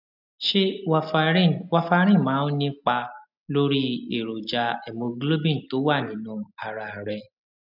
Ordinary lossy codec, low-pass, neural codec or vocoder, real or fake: none; 5.4 kHz; none; real